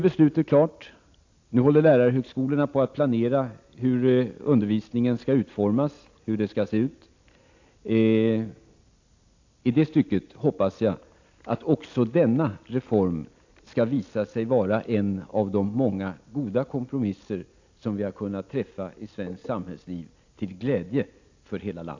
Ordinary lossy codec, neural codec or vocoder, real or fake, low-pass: AAC, 48 kbps; none; real; 7.2 kHz